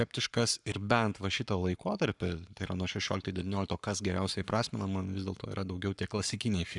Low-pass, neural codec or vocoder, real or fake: 10.8 kHz; codec, 44.1 kHz, 7.8 kbps, Pupu-Codec; fake